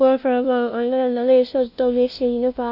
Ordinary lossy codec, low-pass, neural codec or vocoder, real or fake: none; 5.4 kHz; codec, 16 kHz, 0.5 kbps, FunCodec, trained on LibriTTS, 25 frames a second; fake